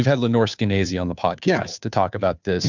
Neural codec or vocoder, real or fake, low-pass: codec, 16 kHz, 2 kbps, FunCodec, trained on Chinese and English, 25 frames a second; fake; 7.2 kHz